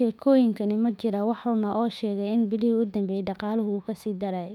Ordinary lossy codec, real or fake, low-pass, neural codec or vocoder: none; fake; 19.8 kHz; autoencoder, 48 kHz, 32 numbers a frame, DAC-VAE, trained on Japanese speech